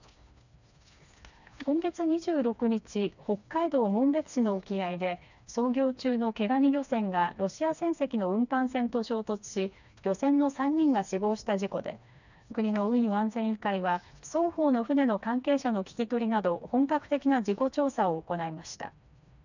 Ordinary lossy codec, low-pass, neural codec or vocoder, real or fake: none; 7.2 kHz; codec, 16 kHz, 2 kbps, FreqCodec, smaller model; fake